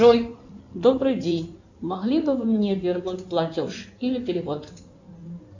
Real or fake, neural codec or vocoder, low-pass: fake; codec, 16 kHz in and 24 kHz out, 2.2 kbps, FireRedTTS-2 codec; 7.2 kHz